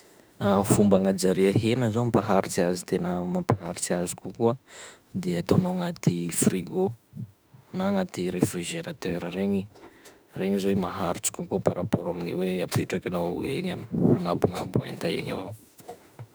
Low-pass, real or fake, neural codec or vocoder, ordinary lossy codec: none; fake; autoencoder, 48 kHz, 32 numbers a frame, DAC-VAE, trained on Japanese speech; none